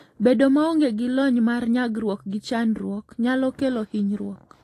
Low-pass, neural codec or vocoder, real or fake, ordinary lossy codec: 14.4 kHz; none; real; AAC, 48 kbps